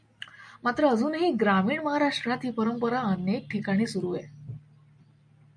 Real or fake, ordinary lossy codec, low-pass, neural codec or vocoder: real; MP3, 96 kbps; 9.9 kHz; none